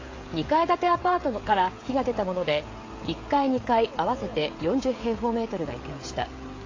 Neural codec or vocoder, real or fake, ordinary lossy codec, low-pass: codec, 16 kHz, 16 kbps, FreqCodec, smaller model; fake; AAC, 32 kbps; 7.2 kHz